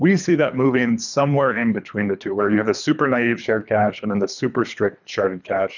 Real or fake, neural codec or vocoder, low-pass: fake; codec, 24 kHz, 3 kbps, HILCodec; 7.2 kHz